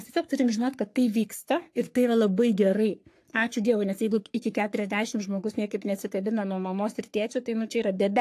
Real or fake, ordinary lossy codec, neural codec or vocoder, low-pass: fake; AAC, 64 kbps; codec, 44.1 kHz, 3.4 kbps, Pupu-Codec; 14.4 kHz